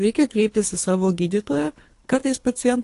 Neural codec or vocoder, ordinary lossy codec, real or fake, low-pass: codec, 24 kHz, 3 kbps, HILCodec; AAC, 48 kbps; fake; 10.8 kHz